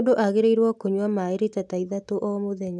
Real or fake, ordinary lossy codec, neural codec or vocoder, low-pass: real; none; none; none